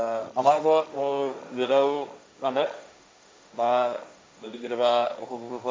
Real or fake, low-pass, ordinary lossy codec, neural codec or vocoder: fake; none; none; codec, 16 kHz, 1.1 kbps, Voila-Tokenizer